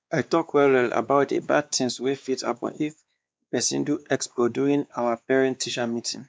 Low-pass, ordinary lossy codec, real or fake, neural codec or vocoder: none; none; fake; codec, 16 kHz, 2 kbps, X-Codec, WavLM features, trained on Multilingual LibriSpeech